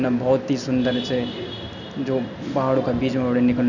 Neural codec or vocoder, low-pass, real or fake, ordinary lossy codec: none; 7.2 kHz; real; none